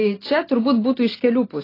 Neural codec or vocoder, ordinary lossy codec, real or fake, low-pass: none; AAC, 24 kbps; real; 5.4 kHz